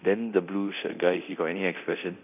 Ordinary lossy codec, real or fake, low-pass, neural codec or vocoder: none; fake; 3.6 kHz; codec, 24 kHz, 0.9 kbps, DualCodec